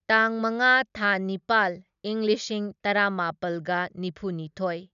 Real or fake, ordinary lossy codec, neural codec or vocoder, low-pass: real; none; none; 7.2 kHz